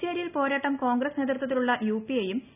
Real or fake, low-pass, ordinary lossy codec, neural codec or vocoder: real; 3.6 kHz; none; none